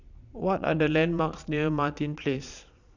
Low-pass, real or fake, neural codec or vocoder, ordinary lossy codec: 7.2 kHz; fake; vocoder, 22.05 kHz, 80 mel bands, Vocos; none